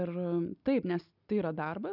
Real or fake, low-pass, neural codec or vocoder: real; 5.4 kHz; none